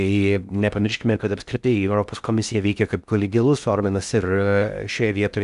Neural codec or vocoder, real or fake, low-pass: codec, 16 kHz in and 24 kHz out, 0.6 kbps, FocalCodec, streaming, 2048 codes; fake; 10.8 kHz